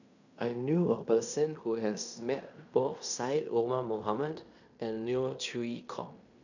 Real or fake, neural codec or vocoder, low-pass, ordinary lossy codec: fake; codec, 16 kHz in and 24 kHz out, 0.9 kbps, LongCat-Audio-Codec, fine tuned four codebook decoder; 7.2 kHz; none